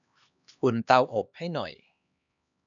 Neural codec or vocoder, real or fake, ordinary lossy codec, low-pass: codec, 16 kHz, 2 kbps, X-Codec, HuBERT features, trained on LibriSpeech; fake; none; 7.2 kHz